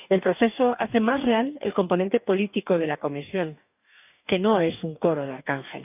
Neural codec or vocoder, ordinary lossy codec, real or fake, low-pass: codec, 44.1 kHz, 2.6 kbps, DAC; none; fake; 3.6 kHz